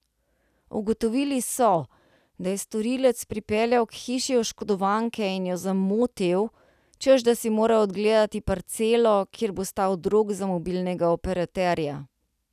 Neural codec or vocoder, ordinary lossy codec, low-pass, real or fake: none; none; 14.4 kHz; real